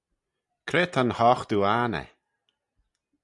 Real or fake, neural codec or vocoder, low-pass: real; none; 10.8 kHz